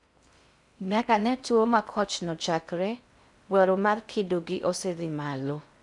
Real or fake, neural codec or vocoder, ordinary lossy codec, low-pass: fake; codec, 16 kHz in and 24 kHz out, 0.6 kbps, FocalCodec, streaming, 4096 codes; none; 10.8 kHz